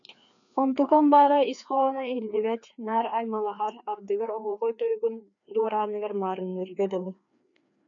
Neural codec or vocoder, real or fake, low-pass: codec, 16 kHz, 2 kbps, FreqCodec, larger model; fake; 7.2 kHz